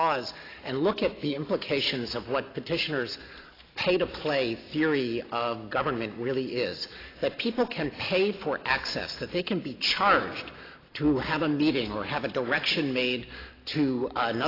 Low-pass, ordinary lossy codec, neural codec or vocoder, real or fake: 5.4 kHz; AAC, 24 kbps; none; real